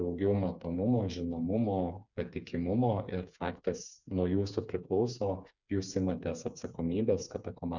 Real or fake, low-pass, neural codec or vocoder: fake; 7.2 kHz; codec, 16 kHz, 4 kbps, FreqCodec, smaller model